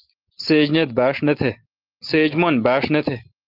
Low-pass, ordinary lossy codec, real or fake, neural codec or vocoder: 5.4 kHz; Opus, 32 kbps; real; none